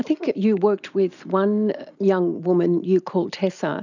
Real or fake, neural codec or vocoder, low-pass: real; none; 7.2 kHz